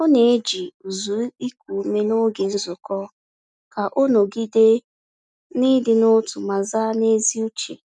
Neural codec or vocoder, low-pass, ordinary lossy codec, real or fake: none; none; none; real